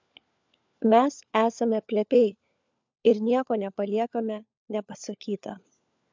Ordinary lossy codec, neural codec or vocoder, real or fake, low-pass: MP3, 64 kbps; codec, 16 kHz, 4 kbps, FunCodec, trained on LibriTTS, 50 frames a second; fake; 7.2 kHz